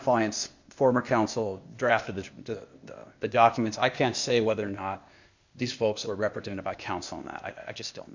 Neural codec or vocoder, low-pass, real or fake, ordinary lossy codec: codec, 16 kHz, 0.8 kbps, ZipCodec; 7.2 kHz; fake; Opus, 64 kbps